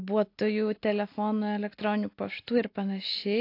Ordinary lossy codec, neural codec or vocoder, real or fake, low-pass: AAC, 32 kbps; none; real; 5.4 kHz